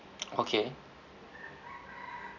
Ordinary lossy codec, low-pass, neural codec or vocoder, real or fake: none; 7.2 kHz; none; real